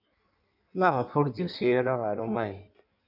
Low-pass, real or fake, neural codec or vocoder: 5.4 kHz; fake; codec, 16 kHz in and 24 kHz out, 1.1 kbps, FireRedTTS-2 codec